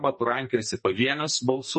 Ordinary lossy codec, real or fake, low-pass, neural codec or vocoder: MP3, 32 kbps; fake; 10.8 kHz; codec, 44.1 kHz, 2.6 kbps, SNAC